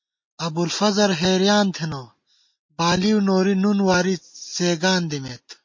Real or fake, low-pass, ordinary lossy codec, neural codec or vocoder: real; 7.2 kHz; MP3, 32 kbps; none